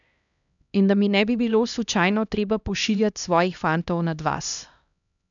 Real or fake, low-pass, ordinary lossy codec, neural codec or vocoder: fake; 7.2 kHz; none; codec, 16 kHz, 1 kbps, X-Codec, HuBERT features, trained on LibriSpeech